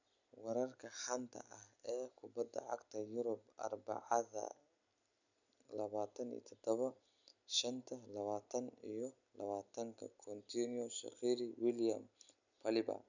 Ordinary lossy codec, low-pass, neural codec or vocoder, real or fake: none; 7.2 kHz; none; real